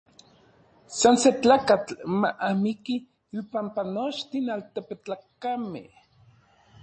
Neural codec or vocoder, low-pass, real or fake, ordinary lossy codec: none; 9.9 kHz; real; MP3, 32 kbps